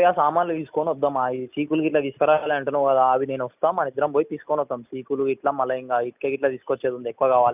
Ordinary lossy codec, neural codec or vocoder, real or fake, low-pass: none; none; real; 3.6 kHz